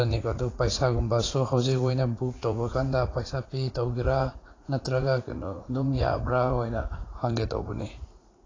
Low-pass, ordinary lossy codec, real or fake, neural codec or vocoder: 7.2 kHz; AAC, 32 kbps; fake; vocoder, 44.1 kHz, 80 mel bands, Vocos